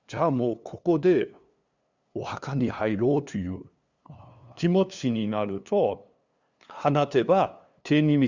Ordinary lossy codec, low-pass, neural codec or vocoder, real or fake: Opus, 64 kbps; 7.2 kHz; codec, 16 kHz, 2 kbps, FunCodec, trained on LibriTTS, 25 frames a second; fake